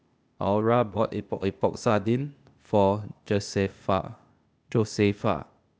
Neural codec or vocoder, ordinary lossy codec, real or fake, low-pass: codec, 16 kHz, 0.8 kbps, ZipCodec; none; fake; none